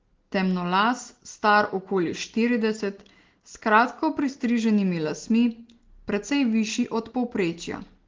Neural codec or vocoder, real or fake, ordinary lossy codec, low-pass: none; real; Opus, 16 kbps; 7.2 kHz